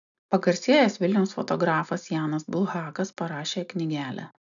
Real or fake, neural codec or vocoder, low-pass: real; none; 7.2 kHz